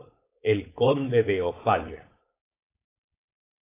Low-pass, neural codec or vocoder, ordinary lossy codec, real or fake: 3.6 kHz; codec, 16 kHz, 4.8 kbps, FACodec; AAC, 24 kbps; fake